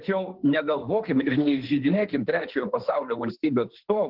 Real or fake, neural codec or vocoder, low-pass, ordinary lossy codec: fake; autoencoder, 48 kHz, 32 numbers a frame, DAC-VAE, trained on Japanese speech; 5.4 kHz; Opus, 16 kbps